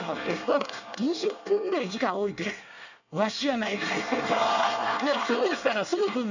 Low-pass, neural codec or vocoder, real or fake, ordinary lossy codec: 7.2 kHz; codec, 24 kHz, 1 kbps, SNAC; fake; none